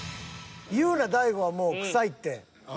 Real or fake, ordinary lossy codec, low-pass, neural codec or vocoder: real; none; none; none